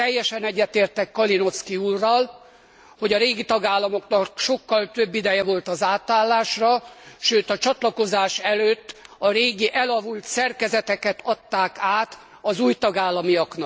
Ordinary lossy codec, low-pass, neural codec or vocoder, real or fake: none; none; none; real